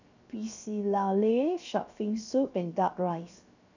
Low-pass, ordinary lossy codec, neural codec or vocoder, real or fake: 7.2 kHz; none; codec, 16 kHz, 0.7 kbps, FocalCodec; fake